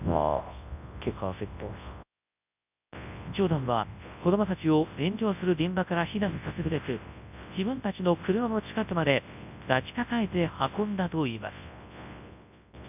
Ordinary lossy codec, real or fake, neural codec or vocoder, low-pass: none; fake; codec, 24 kHz, 0.9 kbps, WavTokenizer, large speech release; 3.6 kHz